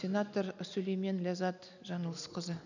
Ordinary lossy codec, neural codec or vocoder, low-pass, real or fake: none; none; 7.2 kHz; real